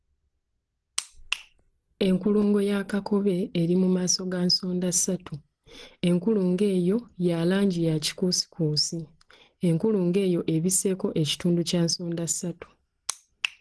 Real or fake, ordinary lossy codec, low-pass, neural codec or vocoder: real; Opus, 16 kbps; 10.8 kHz; none